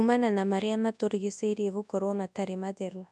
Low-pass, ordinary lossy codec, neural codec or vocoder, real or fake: none; none; codec, 24 kHz, 0.9 kbps, WavTokenizer, large speech release; fake